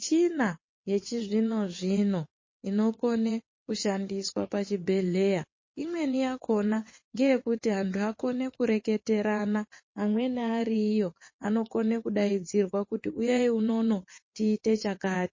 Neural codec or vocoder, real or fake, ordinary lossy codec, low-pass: vocoder, 22.05 kHz, 80 mel bands, WaveNeXt; fake; MP3, 32 kbps; 7.2 kHz